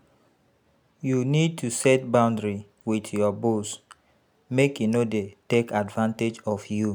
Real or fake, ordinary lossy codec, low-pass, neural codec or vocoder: real; none; none; none